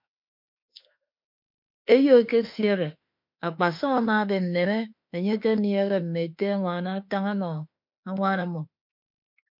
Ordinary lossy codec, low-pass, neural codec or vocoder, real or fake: MP3, 48 kbps; 5.4 kHz; autoencoder, 48 kHz, 32 numbers a frame, DAC-VAE, trained on Japanese speech; fake